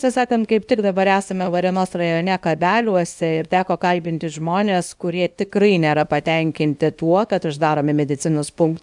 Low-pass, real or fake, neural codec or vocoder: 10.8 kHz; fake; codec, 24 kHz, 0.9 kbps, WavTokenizer, small release